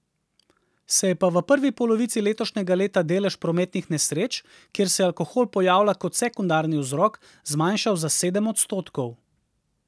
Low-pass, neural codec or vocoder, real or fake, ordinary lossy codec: none; none; real; none